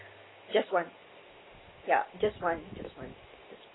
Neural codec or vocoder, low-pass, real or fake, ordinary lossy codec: none; 7.2 kHz; real; AAC, 16 kbps